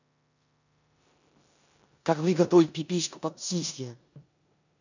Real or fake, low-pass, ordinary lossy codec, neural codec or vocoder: fake; 7.2 kHz; none; codec, 16 kHz in and 24 kHz out, 0.9 kbps, LongCat-Audio-Codec, four codebook decoder